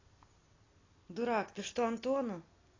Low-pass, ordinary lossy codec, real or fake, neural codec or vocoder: 7.2 kHz; AAC, 32 kbps; real; none